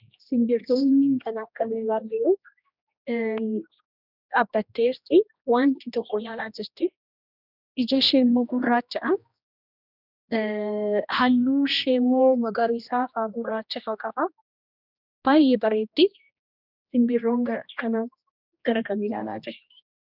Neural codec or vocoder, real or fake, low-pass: codec, 16 kHz, 1 kbps, X-Codec, HuBERT features, trained on general audio; fake; 5.4 kHz